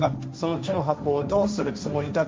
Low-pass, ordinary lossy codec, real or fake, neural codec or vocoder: none; none; fake; codec, 16 kHz, 1.1 kbps, Voila-Tokenizer